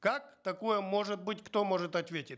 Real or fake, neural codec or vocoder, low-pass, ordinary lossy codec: real; none; none; none